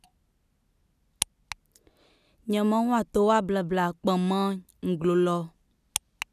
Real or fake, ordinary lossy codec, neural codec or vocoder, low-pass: real; none; none; 14.4 kHz